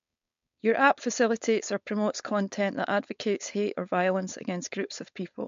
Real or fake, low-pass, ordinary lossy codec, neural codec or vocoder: fake; 7.2 kHz; MP3, 48 kbps; codec, 16 kHz, 4.8 kbps, FACodec